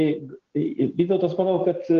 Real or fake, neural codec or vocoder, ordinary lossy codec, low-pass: real; none; Opus, 16 kbps; 7.2 kHz